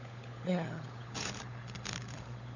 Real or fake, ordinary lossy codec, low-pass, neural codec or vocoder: fake; none; 7.2 kHz; codec, 16 kHz, 16 kbps, FunCodec, trained on LibriTTS, 50 frames a second